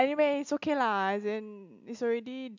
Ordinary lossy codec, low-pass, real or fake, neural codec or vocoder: MP3, 64 kbps; 7.2 kHz; real; none